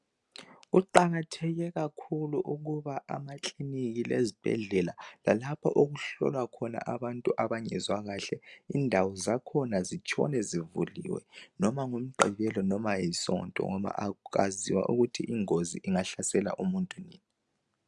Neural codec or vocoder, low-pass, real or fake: none; 10.8 kHz; real